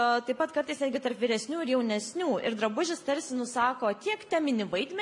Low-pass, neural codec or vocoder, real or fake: 10.8 kHz; none; real